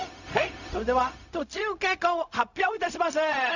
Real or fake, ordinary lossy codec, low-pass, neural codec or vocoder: fake; none; 7.2 kHz; codec, 16 kHz, 0.4 kbps, LongCat-Audio-Codec